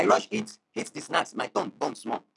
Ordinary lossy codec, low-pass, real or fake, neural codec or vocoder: none; 10.8 kHz; real; none